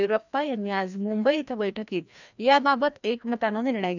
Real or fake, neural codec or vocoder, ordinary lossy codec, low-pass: fake; codec, 16 kHz, 1 kbps, FreqCodec, larger model; none; 7.2 kHz